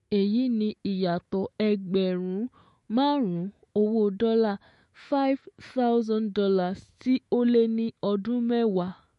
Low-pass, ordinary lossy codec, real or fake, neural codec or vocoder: 14.4 kHz; MP3, 48 kbps; fake; autoencoder, 48 kHz, 128 numbers a frame, DAC-VAE, trained on Japanese speech